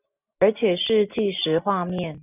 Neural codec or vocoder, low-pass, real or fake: none; 3.6 kHz; real